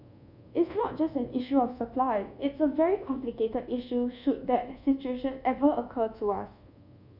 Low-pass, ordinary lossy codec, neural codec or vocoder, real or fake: 5.4 kHz; none; codec, 24 kHz, 1.2 kbps, DualCodec; fake